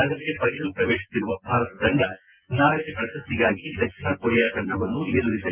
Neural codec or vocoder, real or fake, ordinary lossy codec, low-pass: vocoder, 24 kHz, 100 mel bands, Vocos; fake; Opus, 32 kbps; 3.6 kHz